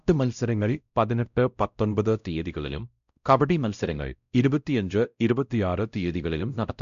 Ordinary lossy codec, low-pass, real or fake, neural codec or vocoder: none; 7.2 kHz; fake; codec, 16 kHz, 0.5 kbps, X-Codec, WavLM features, trained on Multilingual LibriSpeech